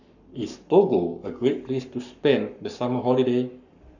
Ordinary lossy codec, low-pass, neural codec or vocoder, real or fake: none; 7.2 kHz; codec, 44.1 kHz, 7.8 kbps, Pupu-Codec; fake